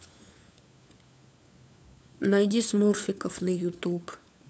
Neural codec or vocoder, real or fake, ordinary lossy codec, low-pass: codec, 16 kHz, 4 kbps, FunCodec, trained on LibriTTS, 50 frames a second; fake; none; none